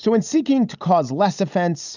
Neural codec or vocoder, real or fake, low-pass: none; real; 7.2 kHz